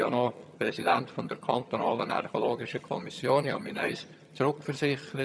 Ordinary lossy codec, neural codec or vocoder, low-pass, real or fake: none; vocoder, 22.05 kHz, 80 mel bands, HiFi-GAN; none; fake